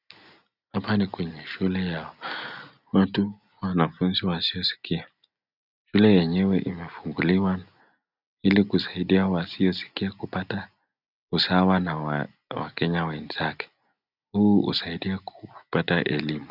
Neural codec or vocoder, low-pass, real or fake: none; 5.4 kHz; real